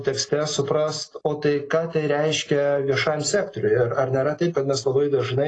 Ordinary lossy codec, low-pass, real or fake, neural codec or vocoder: AAC, 32 kbps; 9.9 kHz; real; none